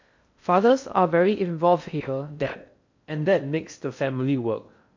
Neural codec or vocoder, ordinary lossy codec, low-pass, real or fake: codec, 16 kHz in and 24 kHz out, 0.6 kbps, FocalCodec, streaming, 4096 codes; MP3, 48 kbps; 7.2 kHz; fake